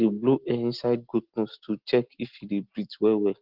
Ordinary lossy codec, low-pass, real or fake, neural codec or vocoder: Opus, 16 kbps; 5.4 kHz; real; none